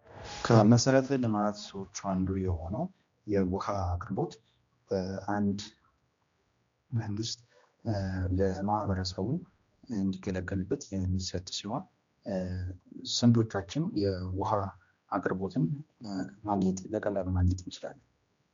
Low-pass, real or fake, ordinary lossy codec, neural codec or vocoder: 7.2 kHz; fake; MP3, 64 kbps; codec, 16 kHz, 1 kbps, X-Codec, HuBERT features, trained on general audio